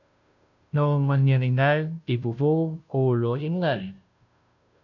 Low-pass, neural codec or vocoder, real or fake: 7.2 kHz; codec, 16 kHz, 0.5 kbps, FunCodec, trained on Chinese and English, 25 frames a second; fake